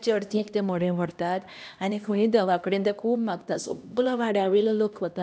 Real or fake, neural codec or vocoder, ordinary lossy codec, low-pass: fake; codec, 16 kHz, 1 kbps, X-Codec, HuBERT features, trained on LibriSpeech; none; none